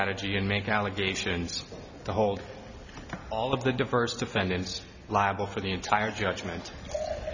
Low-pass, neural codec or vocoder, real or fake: 7.2 kHz; none; real